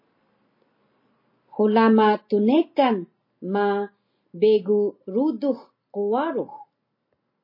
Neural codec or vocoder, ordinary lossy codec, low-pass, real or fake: none; MP3, 24 kbps; 5.4 kHz; real